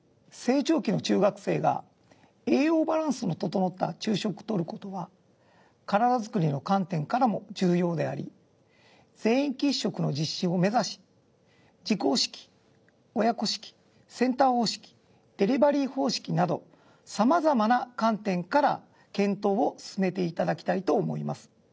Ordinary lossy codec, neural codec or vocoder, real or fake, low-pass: none; none; real; none